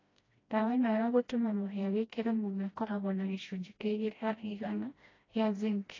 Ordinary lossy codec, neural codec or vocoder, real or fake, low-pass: AAC, 32 kbps; codec, 16 kHz, 1 kbps, FreqCodec, smaller model; fake; 7.2 kHz